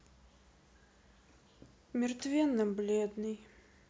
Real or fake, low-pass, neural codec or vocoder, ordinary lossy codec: real; none; none; none